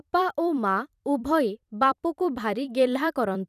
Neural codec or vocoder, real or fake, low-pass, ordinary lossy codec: vocoder, 44.1 kHz, 128 mel bands every 256 samples, BigVGAN v2; fake; 14.4 kHz; none